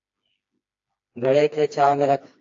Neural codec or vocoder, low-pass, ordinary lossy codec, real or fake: codec, 16 kHz, 2 kbps, FreqCodec, smaller model; 7.2 kHz; AAC, 48 kbps; fake